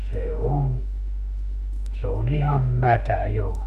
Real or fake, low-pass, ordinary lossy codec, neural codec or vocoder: fake; 14.4 kHz; none; autoencoder, 48 kHz, 32 numbers a frame, DAC-VAE, trained on Japanese speech